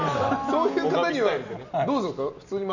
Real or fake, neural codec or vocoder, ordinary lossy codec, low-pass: real; none; none; 7.2 kHz